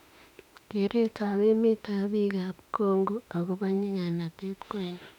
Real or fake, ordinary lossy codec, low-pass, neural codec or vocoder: fake; none; 19.8 kHz; autoencoder, 48 kHz, 32 numbers a frame, DAC-VAE, trained on Japanese speech